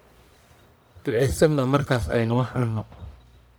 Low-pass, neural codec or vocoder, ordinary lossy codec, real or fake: none; codec, 44.1 kHz, 1.7 kbps, Pupu-Codec; none; fake